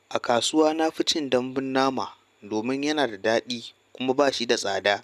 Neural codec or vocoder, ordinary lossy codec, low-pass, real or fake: none; none; 14.4 kHz; real